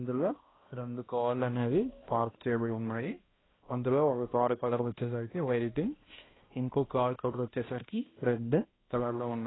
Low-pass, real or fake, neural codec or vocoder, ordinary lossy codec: 7.2 kHz; fake; codec, 16 kHz, 1 kbps, X-Codec, HuBERT features, trained on balanced general audio; AAC, 16 kbps